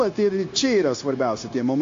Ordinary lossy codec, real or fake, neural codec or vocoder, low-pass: AAC, 48 kbps; fake; codec, 16 kHz, 0.9 kbps, LongCat-Audio-Codec; 7.2 kHz